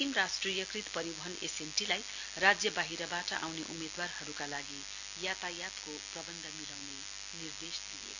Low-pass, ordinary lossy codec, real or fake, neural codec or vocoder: 7.2 kHz; none; real; none